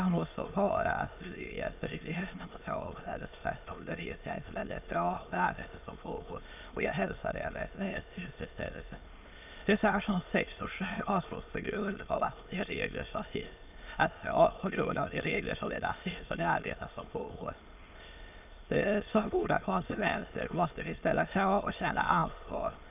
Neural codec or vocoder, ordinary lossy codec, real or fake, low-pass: autoencoder, 22.05 kHz, a latent of 192 numbers a frame, VITS, trained on many speakers; none; fake; 3.6 kHz